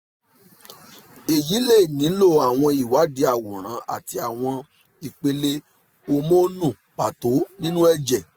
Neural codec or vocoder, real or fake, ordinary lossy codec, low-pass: none; real; none; none